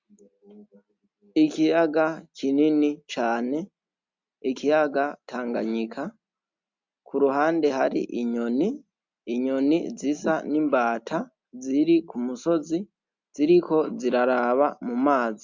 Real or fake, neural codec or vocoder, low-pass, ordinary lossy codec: real; none; 7.2 kHz; MP3, 64 kbps